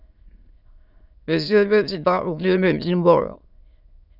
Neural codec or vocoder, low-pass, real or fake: autoencoder, 22.05 kHz, a latent of 192 numbers a frame, VITS, trained on many speakers; 5.4 kHz; fake